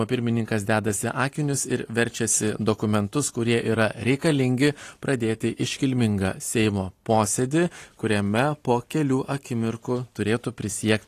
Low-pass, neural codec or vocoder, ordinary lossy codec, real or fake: 14.4 kHz; none; AAC, 48 kbps; real